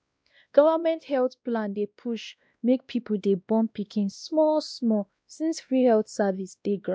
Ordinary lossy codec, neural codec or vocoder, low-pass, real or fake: none; codec, 16 kHz, 1 kbps, X-Codec, WavLM features, trained on Multilingual LibriSpeech; none; fake